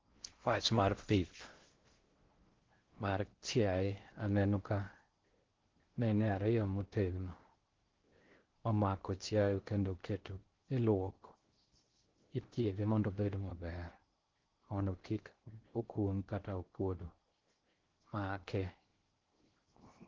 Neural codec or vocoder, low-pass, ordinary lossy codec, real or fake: codec, 16 kHz in and 24 kHz out, 0.6 kbps, FocalCodec, streaming, 4096 codes; 7.2 kHz; Opus, 16 kbps; fake